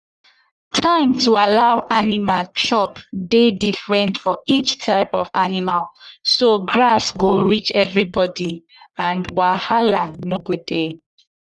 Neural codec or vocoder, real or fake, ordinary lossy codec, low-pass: codec, 44.1 kHz, 1.7 kbps, Pupu-Codec; fake; none; 10.8 kHz